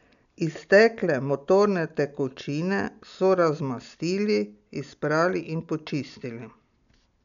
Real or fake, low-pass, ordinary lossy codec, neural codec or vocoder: real; 7.2 kHz; none; none